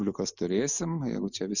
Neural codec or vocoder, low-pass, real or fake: none; 7.2 kHz; real